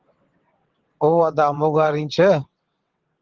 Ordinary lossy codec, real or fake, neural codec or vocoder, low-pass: Opus, 16 kbps; fake; vocoder, 22.05 kHz, 80 mel bands, WaveNeXt; 7.2 kHz